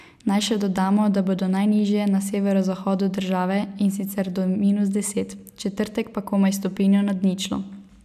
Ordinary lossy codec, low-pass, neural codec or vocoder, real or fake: none; 14.4 kHz; none; real